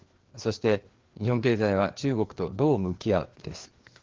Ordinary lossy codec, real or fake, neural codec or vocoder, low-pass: Opus, 16 kbps; fake; codec, 16 kHz, 2 kbps, FreqCodec, larger model; 7.2 kHz